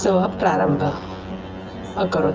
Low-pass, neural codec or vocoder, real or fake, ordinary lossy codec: 7.2 kHz; vocoder, 24 kHz, 100 mel bands, Vocos; fake; Opus, 24 kbps